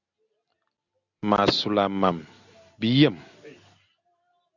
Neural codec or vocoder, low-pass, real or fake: none; 7.2 kHz; real